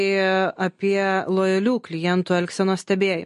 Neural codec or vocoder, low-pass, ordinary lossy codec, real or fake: none; 14.4 kHz; MP3, 48 kbps; real